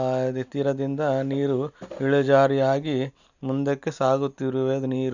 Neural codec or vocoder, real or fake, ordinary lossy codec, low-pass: none; real; none; 7.2 kHz